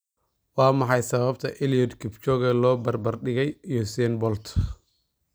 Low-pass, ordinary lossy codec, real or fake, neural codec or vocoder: none; none; real; none